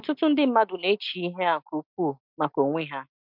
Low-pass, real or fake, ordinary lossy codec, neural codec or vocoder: 5.4 kHz; real; MP3, 48 kbps; none